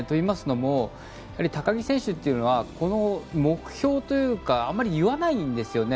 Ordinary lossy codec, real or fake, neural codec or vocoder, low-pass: none; real; none; none